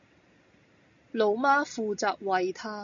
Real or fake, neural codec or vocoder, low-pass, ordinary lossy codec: real; none; 7.2 kHz; AAC, 64 kbps